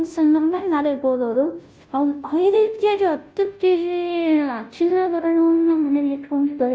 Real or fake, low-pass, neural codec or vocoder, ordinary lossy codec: fake; none; codec, 16 kHz, 0.5 kbps, FunCodec, trained on Chinese and English, 25 frames a second; none